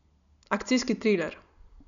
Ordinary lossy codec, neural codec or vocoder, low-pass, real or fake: none; none; 7.2 kHz; real